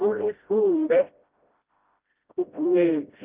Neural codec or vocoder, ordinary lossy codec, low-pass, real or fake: codec, 16 kHz, 0.5 kbps, FreqCodec, smaller model; Opus, 32 kbps; 3.6 kHz; fake